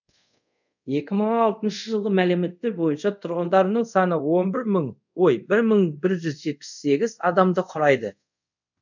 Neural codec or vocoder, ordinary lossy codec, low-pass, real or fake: codec, 24 kHz, 0.5 kbps, DualCodec; none; 7.2 kHz; fake